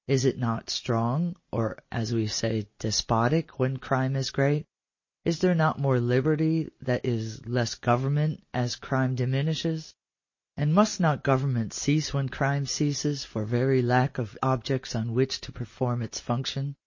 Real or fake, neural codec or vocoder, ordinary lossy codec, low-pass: real; none; MP3, 32 kbps; 7.2 kHz